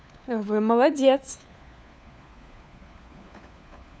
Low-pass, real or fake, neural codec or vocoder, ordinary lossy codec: none; fake; codec, 16 kHz, 4 kbps, FunCodec, trained on LibriTTS, 50 frames a second; none